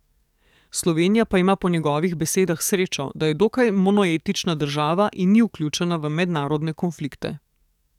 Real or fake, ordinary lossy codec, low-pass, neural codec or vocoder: fake; none; 19.8 kHz; codec, 44.1 kHz, 7.8 kbps, DAC